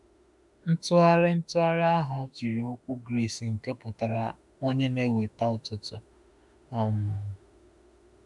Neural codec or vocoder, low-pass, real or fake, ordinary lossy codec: autoencoder, 48 kHz, 32 numbers a frame, DAC-VAE, trained on Japanese speech; 10.8 kHz; fake; MP3, 96 kbps